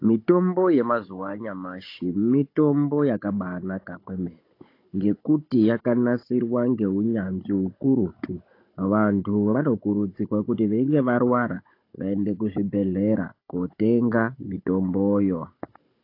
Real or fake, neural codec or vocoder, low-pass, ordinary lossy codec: fake; codec, 16 kHz, 16 kbps, FunCodec, trained on Chinese and English, 50 frames a second; 5.4 kHz; AAC, 32 kbps